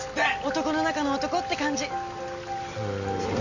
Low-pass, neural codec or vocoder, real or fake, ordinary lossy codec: 7.2 kHz; none; real; none